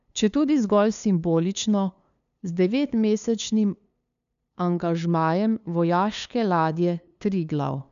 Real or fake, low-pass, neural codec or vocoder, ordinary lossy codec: fake; 7.2 kHz; codec, 16 kHz, 2 kbps, FunCodec, trained on LibriTTS, 25 frames a second; none